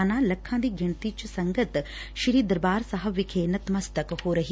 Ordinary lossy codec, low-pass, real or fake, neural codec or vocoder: none; none; real; none